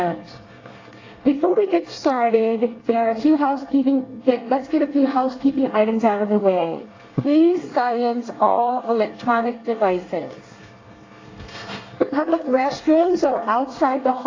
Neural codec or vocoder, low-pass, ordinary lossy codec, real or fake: codec, 24 kHz, 1 kbps, SNAC; 7.2 kHz; AAC, 32 kbps; fake